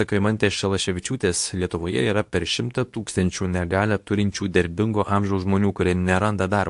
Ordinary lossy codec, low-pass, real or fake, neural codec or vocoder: AAC, 64 kbps; 10.8 kHz; fake; codec, 24 kHz, 0.9 kbps, WavTokenizer, medium speech release version 2